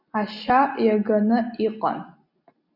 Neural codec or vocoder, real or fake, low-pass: none; real; 5.4 kHz